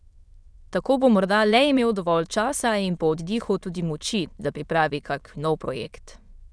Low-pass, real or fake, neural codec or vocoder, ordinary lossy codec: none; fake; autoencoder, 22.05 kHz, a latent of 192 numbers a frame, VITS, trained on many speakers; none